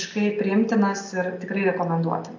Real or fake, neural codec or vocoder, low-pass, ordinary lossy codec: real; none; 7.2 kHz; AAC, 48 kbps